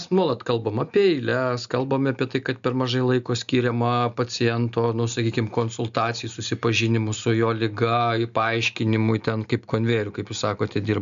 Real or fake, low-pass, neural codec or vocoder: real; 7.2 kHz; none